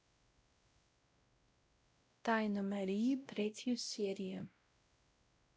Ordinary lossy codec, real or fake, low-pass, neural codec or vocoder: none; fake; none; codec, 16 kHz, 0.5 kbps, X-Codec, WavLM features, trained on Multilingual LibriSpeech